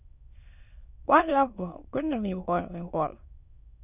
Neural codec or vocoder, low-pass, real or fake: autoencoder, 22.05 kHz, a latent of 192 numbers a frame, VITS, trained on many speakers; 3.6 kHz; fake